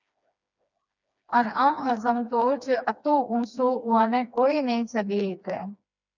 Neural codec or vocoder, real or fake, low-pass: codec, 16 kHz, 2 kbps, FreqCodec, smaller model; fake; 7.2 kHz